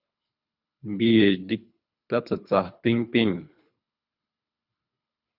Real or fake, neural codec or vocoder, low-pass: fake; codec, 24 kHz, 3 kbps, HILCodec; 5.4 kHz